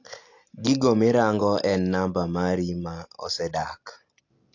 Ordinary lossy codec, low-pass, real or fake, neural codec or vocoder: AAC, 48 kbps; 7.2 kHz; real; none